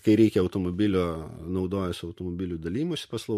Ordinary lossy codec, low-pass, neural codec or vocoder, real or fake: MP3, 64 kbps; 14.4 kHz; none; real